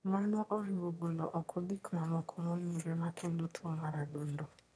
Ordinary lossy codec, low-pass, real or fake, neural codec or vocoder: none; none; fake; autoencoder, 22.05 kHz, a latent of 192 numbers a frame, VITS, trained on one speaker